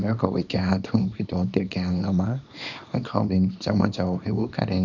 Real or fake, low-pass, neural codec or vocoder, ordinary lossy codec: fake; 7.2 kHz; codec, 24 kHz, 0.9 kbps, WavTokenizer, small release; none